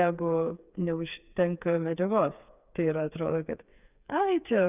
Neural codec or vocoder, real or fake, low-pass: codec, 16 kHz, 4 kbps, FreqCodec, smaller model; fake; 3.6 kHz